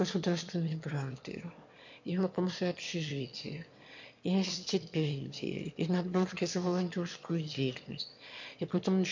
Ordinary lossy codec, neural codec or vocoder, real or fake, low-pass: MP3, 48 kbps; autoencoder, 22.05 kHz, a latent of 192 numbers a frame, VITS, trained on one speaker; fake; 7.2 kHz